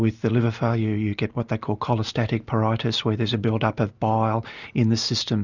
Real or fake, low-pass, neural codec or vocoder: real; 7.2 kHz; none